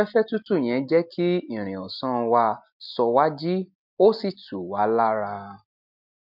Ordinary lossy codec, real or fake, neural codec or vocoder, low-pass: MP3, 48 kbps; real; none; 5.4 kHz